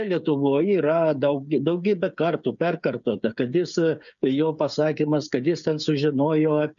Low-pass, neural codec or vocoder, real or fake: 7.2 kHz; codec, 16 kHz, 8 kbps, FreqCodec, smaller model; fake